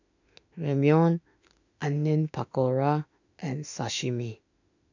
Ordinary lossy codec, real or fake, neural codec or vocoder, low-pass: none; fake; autoencoder, 48 kHz, 32 numbers a frame, DAC-VAE, trained on Japanese speech; 7.2 kHz